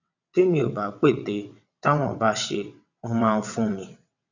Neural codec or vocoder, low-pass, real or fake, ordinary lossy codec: vocoder, 22.05 kHz, 80 mel bands, WaveNeXt; 7.2 kHz; fake; none